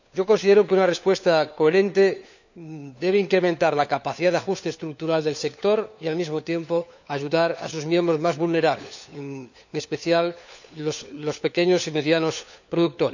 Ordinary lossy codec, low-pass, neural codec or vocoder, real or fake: none; 7.2 kHz; codec, 16 kHz, 4 kbps, FunCodec, trained on LibriTTS, 50 frames a second; fake